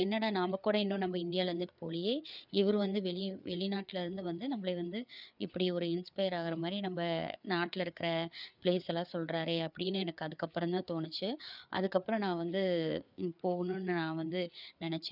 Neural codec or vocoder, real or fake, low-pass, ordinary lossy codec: codec, 16 kHz, 4 kbps, FreqCodec, larger model; fake; 5.4 kHz; none